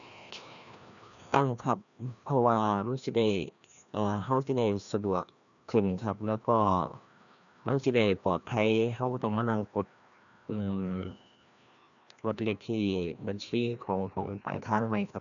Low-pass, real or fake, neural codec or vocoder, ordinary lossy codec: 7.2 kHz; fake; codec, 16 kHz, 1 kbps, FreqCodec, larger model; none